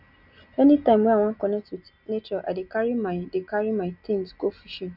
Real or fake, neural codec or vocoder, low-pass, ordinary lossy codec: real; none; 5.4 kHz; none